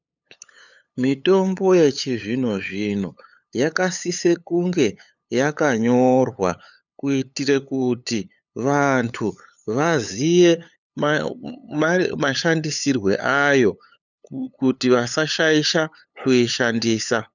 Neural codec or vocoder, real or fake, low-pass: codec, 16 kHz, 8 kbps, FunCodec, trained on LibriTTS, 25 frames a second; fake; 7.2 kHz